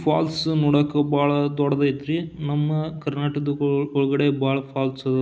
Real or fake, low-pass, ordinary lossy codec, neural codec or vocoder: real; none; none; none